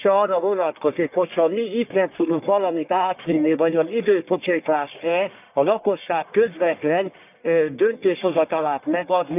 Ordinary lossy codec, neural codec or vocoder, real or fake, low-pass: none; codec, 44.1 kHz, 1.7 kbps, Pupu-Codec; fake; 3.6 kHz